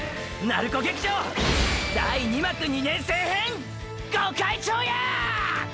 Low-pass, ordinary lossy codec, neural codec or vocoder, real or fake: none; none; none; real